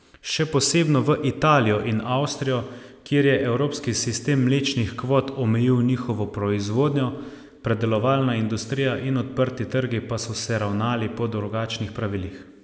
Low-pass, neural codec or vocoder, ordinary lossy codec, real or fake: none; none; none; real